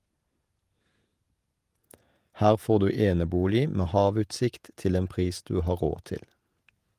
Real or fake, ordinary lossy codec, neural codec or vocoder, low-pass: fake; Opus, 32 kbps; vocoder, 48 kHz, 128 mel bands, Vocos; 14.4 kHz